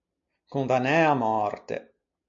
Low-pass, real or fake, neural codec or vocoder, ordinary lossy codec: 7.2 kHz; real; none; MP3, 64 kbps